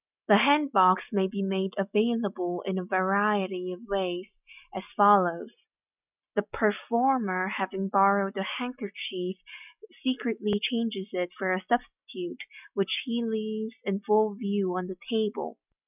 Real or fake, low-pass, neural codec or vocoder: real; 3.6 kHz; none